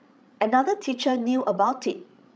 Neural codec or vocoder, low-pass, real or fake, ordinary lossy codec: codec, 16 kHz, 16 kbps, FreqCodec, larger model; none; fake; none